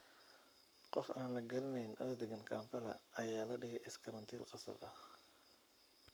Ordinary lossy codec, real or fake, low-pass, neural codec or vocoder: none; fake; none; codec, 44.1 kHz, 7.8 kbps, Pupu-Codec